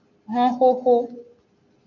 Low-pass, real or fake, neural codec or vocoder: 7.2 kHz; real; none